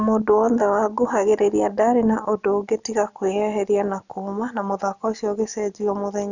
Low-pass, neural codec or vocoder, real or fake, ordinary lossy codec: 7.2 kHz; none; real; none